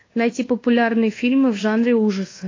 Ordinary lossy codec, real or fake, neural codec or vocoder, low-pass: AAC, 32 kbps; fake; codec, 24 kHz, 1.2 kbps, DualCodec; 7.2 kHz